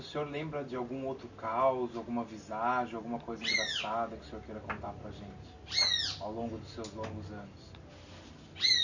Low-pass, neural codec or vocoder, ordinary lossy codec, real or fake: 7.2 kHz; none; none; real